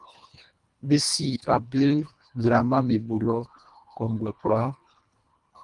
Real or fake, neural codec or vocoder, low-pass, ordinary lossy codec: fake; codec, 24 kHz, 1.5 kbps, HILCodec; 10.8 kHz; Opus, 32 kbps